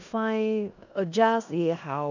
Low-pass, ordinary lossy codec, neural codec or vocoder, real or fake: 7.2 kHz; none; codec, 16 kHz in and 24 kHz out, 0.9 kbps, LongCat-Audio-Codec, four codebook decoder; fake